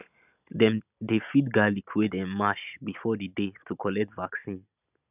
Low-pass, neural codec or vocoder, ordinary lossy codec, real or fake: 3.6 kHz; none; none; real